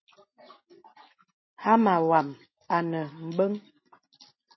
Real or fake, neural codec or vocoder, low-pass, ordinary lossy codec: real; none; 7.2 kHz; MP3, 24 kbps